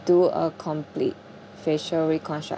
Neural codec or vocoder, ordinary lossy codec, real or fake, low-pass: none; none; real; none